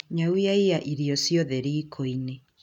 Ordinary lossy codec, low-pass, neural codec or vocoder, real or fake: none; 19.8 kHz; none; real